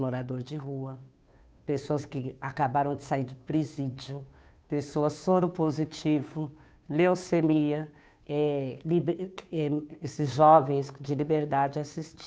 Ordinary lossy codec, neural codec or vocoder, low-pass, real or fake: none; codec, 16 kHz, 2 kbps, FunCodec, trained on Chinese and English, 25 frames a second; none; fake